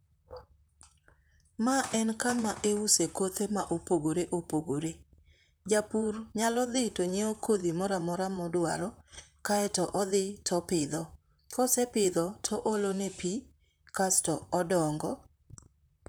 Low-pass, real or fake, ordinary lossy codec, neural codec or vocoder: none; fake; none; vocoder, 44.1 kHz, 128 mel bands, Pupu-Vocoder